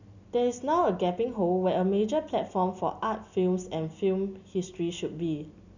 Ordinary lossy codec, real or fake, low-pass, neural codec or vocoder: none; real; 7.2 kHz; none